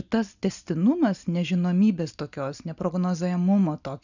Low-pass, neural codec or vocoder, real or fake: 7.2 kHz; none; real